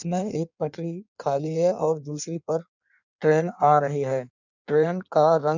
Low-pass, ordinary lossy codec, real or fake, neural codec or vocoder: 7.2 kHz; none; fake; codec, 16 kHz in and 24 kHz out, 1.1 kbps, FireRedTTS-2 codec